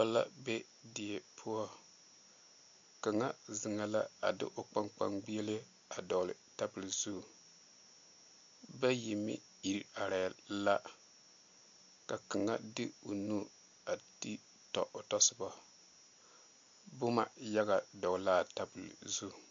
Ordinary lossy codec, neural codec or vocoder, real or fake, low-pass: MP3, 48 kbps; none; real; 7.2 kHz